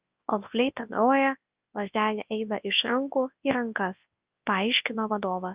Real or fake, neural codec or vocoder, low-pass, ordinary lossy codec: fake; codec, 24 kHz, 0.9 kbps, WavTokenizer, large speech release; 3.6 kHz; Opus, 24 kbps